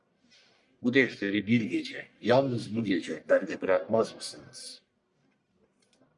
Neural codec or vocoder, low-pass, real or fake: codec, 44.1 kHz, 1.7 kbps, Pupu-Codec; 10.8 kHz; fake